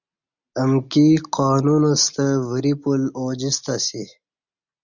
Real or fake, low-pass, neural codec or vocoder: real; 7.2 kHz; none